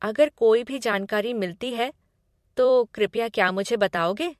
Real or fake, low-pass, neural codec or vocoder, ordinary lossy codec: fake; 14.4 kHz; vocoder, 44.1 kHz, 128 mel bands every 256 samples, BigVGAN v2; MP3, 64 kbps